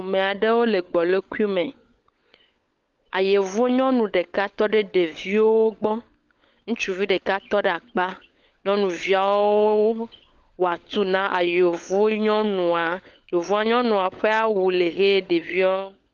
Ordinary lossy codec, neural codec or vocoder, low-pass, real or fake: Opus, 32 kbps; codec, 16 kHz, 16 kbps, FunCodec, trained on LibriTTS, 50 frames a second; 7.2 kHz; fake